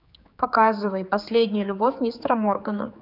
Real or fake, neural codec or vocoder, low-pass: fake; codec, 16 kHz, 4 kbps, X-Codec, HuBERT features, trained on general audio; 5.4 kHz